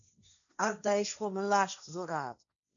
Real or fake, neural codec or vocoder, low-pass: fake; codec, 16 kHz, 1.1 kbps, Voila-Tokenizer; 7.2 kHz